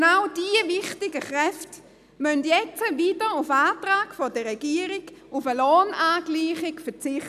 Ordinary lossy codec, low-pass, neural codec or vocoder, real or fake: none; 14.4 kHz; none; real